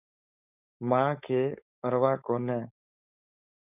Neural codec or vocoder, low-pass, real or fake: codec, 16 kHz, 4.8 kbps, FACodec; 3.6 kHz; fake